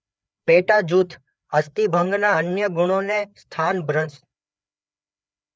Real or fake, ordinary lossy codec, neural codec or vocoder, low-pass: fake; none; codec, 16 kHz, 4 kbps, FreqCodec, larger model; none